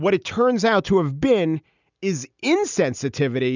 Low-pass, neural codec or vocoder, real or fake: 7.2 kHz; none; real